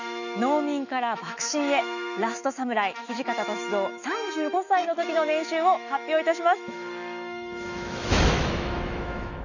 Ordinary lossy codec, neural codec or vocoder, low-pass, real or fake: none; autoencoder, 48 kHz, 128 numbers a frame, DAC-VAE, trained on Japanese speech; 7.2 kHz; fake